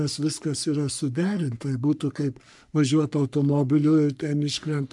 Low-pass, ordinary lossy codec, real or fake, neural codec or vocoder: 10.8 kHz; MP3, 96 kbps; fake; codec, 44.1 kHz, 3.4 kbps, Pupu-Codec